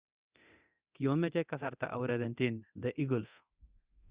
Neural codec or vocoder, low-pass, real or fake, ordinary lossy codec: codec, 24 kHz, 0.9 kbps, DualCodec; 3.6 kHz; fake; Opus, 64 kbps